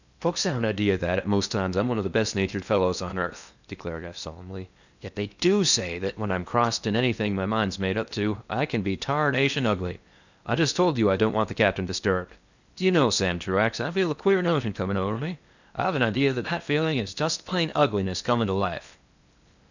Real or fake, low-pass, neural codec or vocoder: fake; 7.2 kHz; codec, 16 kHz in and 24 kHz out, 0.8 kbps, FocalCodec, streaming, 65536 codes